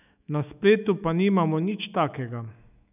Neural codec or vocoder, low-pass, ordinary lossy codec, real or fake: autoencoder, 48 kHz, 128 numbers a frame, DAC-VAE, trained on Japanese speech; 3.6 kHz; none; fake